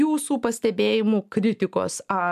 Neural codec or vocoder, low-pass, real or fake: none; 14.4 kHz; real